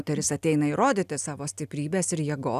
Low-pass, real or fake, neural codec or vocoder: 14.4 kHz; real; none